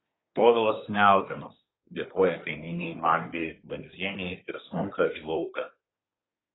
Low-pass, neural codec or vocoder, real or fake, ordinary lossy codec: 7.2 kHz; codec, 24 kHz, 1 kbps, SNAC; fake; AAC, 16 kbps